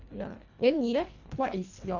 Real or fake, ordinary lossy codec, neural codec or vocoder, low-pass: fake; none; codec, 24 kHz, 1.5 kbps, HILCodec; 7.2 kHz